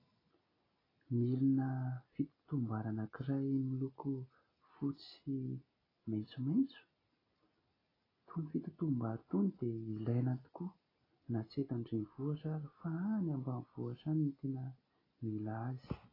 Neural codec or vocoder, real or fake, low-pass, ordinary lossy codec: none; real; 5.4 kHz; AAC, 24 kbps